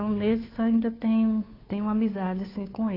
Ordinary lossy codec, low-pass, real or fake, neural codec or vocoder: AAC, 24 kbps; 5.4 kHz; fake; codec, 16 kHz, 2 kbps, FunCodec, trained on Chinese and English, 25 frames a second